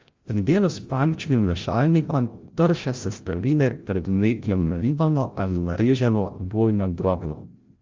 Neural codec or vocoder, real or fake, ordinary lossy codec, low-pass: codec, 16 kHz, 0.5 kbps, FreqCodec, larger model; fake; Opus, 32 kbps; 7.2 kHz